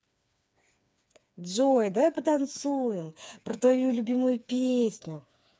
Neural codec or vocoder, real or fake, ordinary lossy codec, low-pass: codec, 16 kHz, 4 kbps, FreqCodec, smaller model; fake; none; none